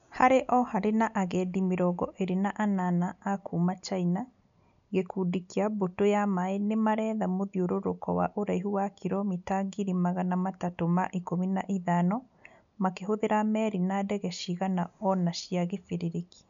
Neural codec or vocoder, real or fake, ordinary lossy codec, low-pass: none; real; none; 7.2 kHz